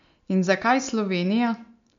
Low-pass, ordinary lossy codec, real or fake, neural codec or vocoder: 7.2 kHz; MP3, 64 kbps; real; none